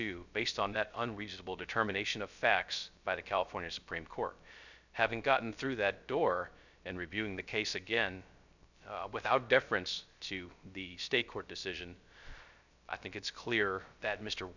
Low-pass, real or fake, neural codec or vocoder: 7.2 kHz; fake; codec, 16 kHz, 0.3 kbps, FocalCodec